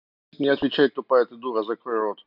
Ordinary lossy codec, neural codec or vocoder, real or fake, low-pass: none; none; real; 5.4 kHz